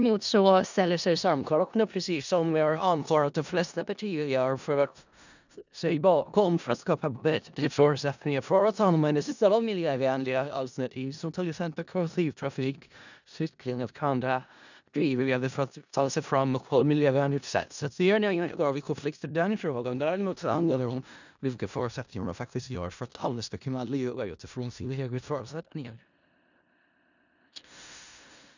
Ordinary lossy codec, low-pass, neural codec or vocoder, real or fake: none; 7.2 kHz; codec, 16 kHz in and 24 kHz out, 0.4 kbps, LongCat-Audio-Codec, four codebook decoder; fake